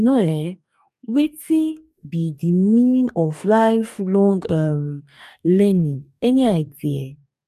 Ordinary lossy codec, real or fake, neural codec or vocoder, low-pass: none; fake; codec, 44.1 kHz, 2.6 kbps, DAC; 14.4 kHz